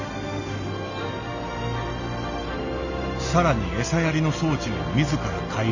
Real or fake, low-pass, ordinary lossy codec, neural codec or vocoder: real; 7.2 kHz; none; none